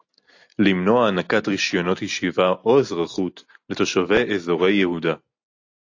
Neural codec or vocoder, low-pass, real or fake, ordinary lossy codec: none; 7.2 kHz; real; AAC, 48 kbps